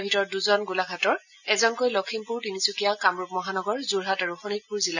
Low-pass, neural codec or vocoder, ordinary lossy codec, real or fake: 7.2 kHz; none; none; real